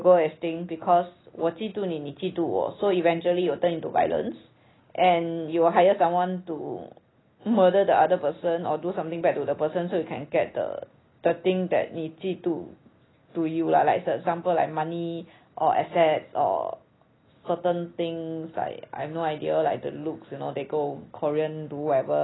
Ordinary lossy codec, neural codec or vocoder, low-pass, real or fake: AAC, 16 kbps; none; 7.2 kHz; real